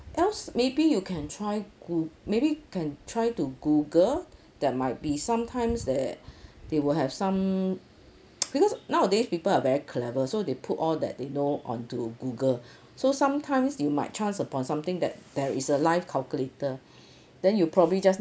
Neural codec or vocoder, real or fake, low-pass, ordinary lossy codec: none; real; none; none